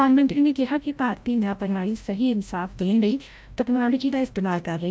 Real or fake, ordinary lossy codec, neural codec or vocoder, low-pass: fake; none; codec, 16 kHz, 0.5 kbps, FreqCodec, larger model; none